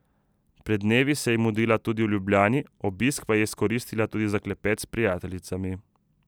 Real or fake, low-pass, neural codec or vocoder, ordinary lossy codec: real; none; none; none